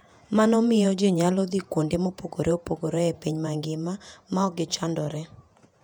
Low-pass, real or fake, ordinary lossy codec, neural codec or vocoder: 19.8 kHz; fake; none; vocoder, 48 kHz, 128 mel bands, Vocos